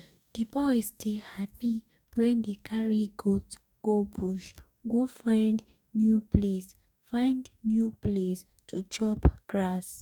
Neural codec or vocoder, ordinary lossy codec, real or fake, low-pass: codec, 44.1 kHz, 2.6 kbps, DAC; none; fake; 19.8 kHz